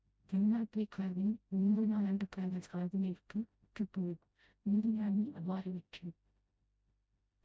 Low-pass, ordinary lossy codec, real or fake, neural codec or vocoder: none; none; fake; codec, 16 kHz, 0.5 kbps, FreqCodec, smaller model